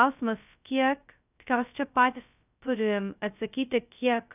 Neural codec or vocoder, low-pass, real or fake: codec, 16 kHz, 0.2 kbps, FocalCodec; 3.6 kHz; fake